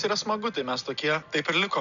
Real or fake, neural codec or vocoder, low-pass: real; none; 7.2 kHz